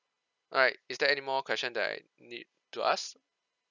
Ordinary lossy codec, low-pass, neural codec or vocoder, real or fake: none; 7.2 kHz; none; real